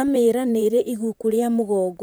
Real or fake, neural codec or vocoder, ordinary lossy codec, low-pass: fake; vocoder, 44.1 kHz, 128 mel bands, Pupu-Vocoder; none; none